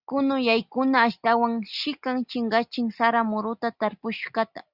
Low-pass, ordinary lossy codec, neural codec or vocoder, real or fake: 5.4 kHz; Opus, 64 kbps; none; real